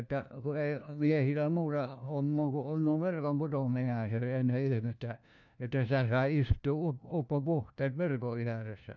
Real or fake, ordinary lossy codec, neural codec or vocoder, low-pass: fake; none; codec, 16 kHz, 1 kbps, FunCodec, trained on LibriTTS, 50 frames a second; 7.2 kHz